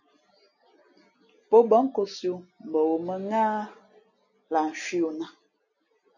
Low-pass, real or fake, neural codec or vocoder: 7.2 kHz; real; none